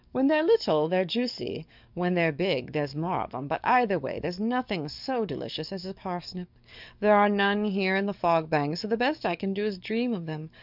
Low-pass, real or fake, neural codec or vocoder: 5.4 kHz; fake; codec, 44.1 kHz, 7.8 kbps, DAC